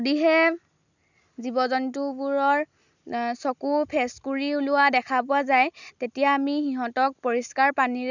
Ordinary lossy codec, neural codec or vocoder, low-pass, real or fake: none; none; 7.2 kHz; real